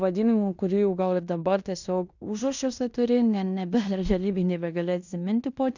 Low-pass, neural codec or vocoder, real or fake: 7.2 kHz; codec, 16 kHz in and 24 kHz out, 0.9 kbps, LongCat-Audio-Codec, fine tuned four codebook decoder; fake